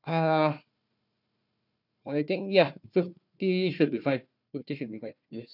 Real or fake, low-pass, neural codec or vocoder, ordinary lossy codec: fake; 5.4 kHz; codec, 16 kHz, 1 kbps, FunCodec, trained on Chinese and English, 50 frames a second; none